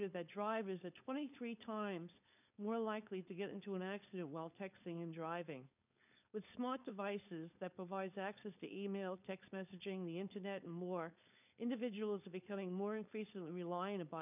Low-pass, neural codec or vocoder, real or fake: 3.6 kHz; codec, 16 kHz, 4.8 kbps, FACodec; fake